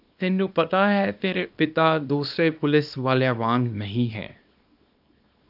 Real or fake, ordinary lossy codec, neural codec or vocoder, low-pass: fake; AAC, 48 kbps; codec, 24 kHz, 0.9 kbps, WavTokenizer, small release; 5.4 kHz